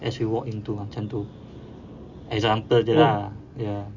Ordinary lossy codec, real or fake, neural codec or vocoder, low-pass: none; real; none; 7.2 kHz